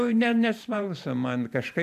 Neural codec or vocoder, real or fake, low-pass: vocoder, 44.1 kHz, 128 mel bands every 512 samples, BigVGAN v2; fake; 14.4 kHz